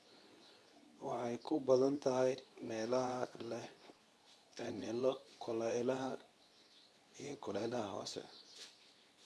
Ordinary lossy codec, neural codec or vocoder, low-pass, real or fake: none; codec, 24 kHz, 0.9 kbps, WavTokenizer, medium speech release version 2; none; fake